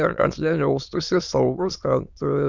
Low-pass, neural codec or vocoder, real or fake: 7.2 kHz; autoencoder, 22.05 kHz, a latent of 192 numbers a frame, VITS, trained on many speakers; fake